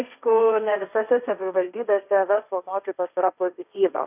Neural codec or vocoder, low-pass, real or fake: codec, 16 kHz, 1.1 kbps, Voila-Tokenizer; 3.6 kHz; fake